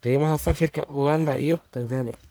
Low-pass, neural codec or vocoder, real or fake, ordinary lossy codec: none; codec, 44.1 kHz, 1.7 kbps, Pupu-Codec; fake; none